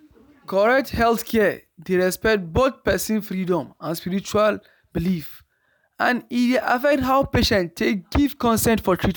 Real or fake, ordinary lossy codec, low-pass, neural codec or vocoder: real; none; none; none